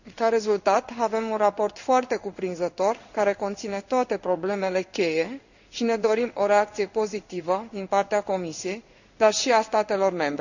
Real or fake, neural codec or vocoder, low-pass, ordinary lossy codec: fake; codec, 16 kHz in and 24 kHz out, 1 kbps, XY-Tokenizer; 7.2 kHz; none